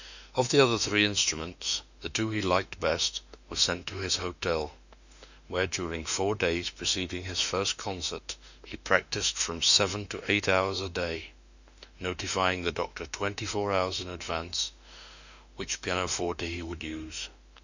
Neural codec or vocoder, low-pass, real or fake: autoencoder, 48 kHz, 32 numbers a frame, DAC-VAE, trained on Japanese speech; 7.2 kHz; fake